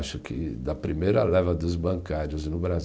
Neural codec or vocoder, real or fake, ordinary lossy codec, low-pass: none; real; none; none